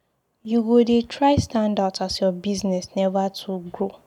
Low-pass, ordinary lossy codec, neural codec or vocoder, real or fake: 19.8 kHz; none; none; real